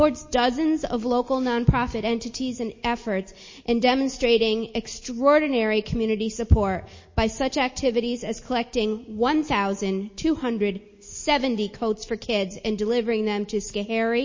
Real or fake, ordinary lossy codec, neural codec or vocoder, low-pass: real; MP3, 32 kbps; none; 7.2 kHz